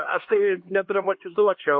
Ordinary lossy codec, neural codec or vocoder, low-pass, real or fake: MP3, 32 kbps; codec, 16 kHz, 2 kbps, X-Codec, HuBERT features, trained on LibriSpeech; 7.2 kHz; fake